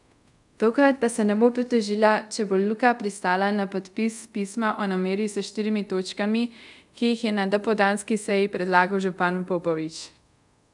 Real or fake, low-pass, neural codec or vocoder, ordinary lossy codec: fake; 10.8 kHz; codec, 24 kHz, 0.5 kbps, DualCodec; none